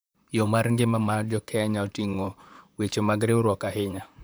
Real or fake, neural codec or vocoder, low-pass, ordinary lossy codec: fake; vocoder, 44.1 kHz, 128 mel bands, Pupu-Vocoder; none; none